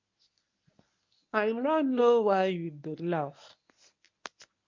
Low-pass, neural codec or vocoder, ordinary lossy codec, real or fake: 7.2 kHz; codec, 24 kHz, 0.9 kbps, WavTokenizer, medium speech release version 1; MP3, 48 kbps; fake